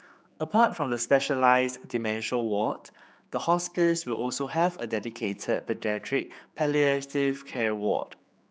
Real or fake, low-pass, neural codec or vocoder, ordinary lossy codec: fake; none; codec, 16 kHz, 4 kbps, X-Codec, HuBERT features, trained on general audio; none